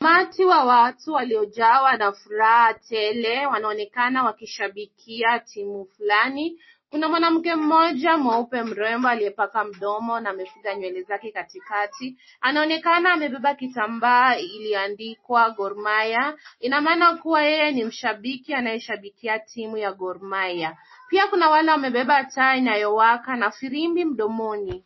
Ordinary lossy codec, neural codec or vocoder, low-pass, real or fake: MP3, 24 kbps; none; 7.2 kHz; real